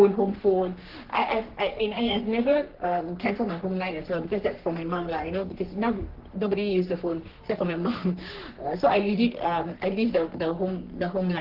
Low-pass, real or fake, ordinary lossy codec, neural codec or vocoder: 5.4 kHz; fake; Opus, 16 kbps; codec, 44.1 kHz, 3.4 kbps, Pupu-Codec